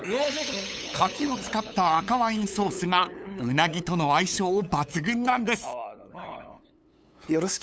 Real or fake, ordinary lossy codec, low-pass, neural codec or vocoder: fake; none; none; codec, 16 kHz, 8 kbps, FunCodec, trained on LibriTTS, 25 frames a second